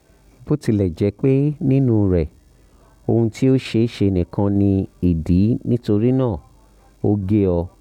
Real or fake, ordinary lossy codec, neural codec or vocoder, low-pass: real; none; none; 19.8 kHz